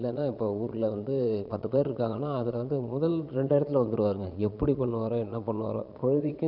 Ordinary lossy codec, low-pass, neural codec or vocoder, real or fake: none; 5.4 kHz; vocoder, 22.05 kHz, 80 mel bands, Vocos; fake